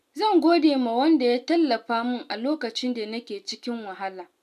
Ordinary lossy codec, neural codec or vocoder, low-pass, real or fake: none; none; 14.4 kHz; real